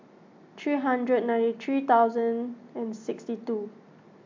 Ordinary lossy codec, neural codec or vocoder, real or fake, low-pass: MP3, 64 kbps; none; real; 7.2 kHz